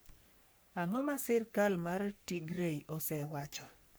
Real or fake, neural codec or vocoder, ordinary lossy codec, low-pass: fake; codec, 44.1 kHz, 3.4 kbps, Pupu-Codec; none; none